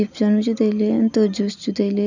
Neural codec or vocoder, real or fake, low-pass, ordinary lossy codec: none; real; 7.2 kHz; none